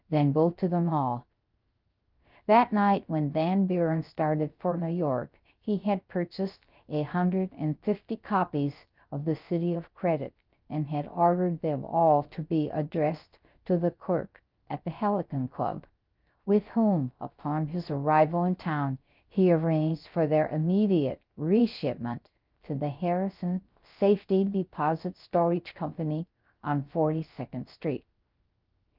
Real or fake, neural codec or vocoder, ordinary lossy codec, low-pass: fake; codec, 16 kHz, 0.3 kbps, FocalCodec; Opus, 24 kbps; 5.4 kHz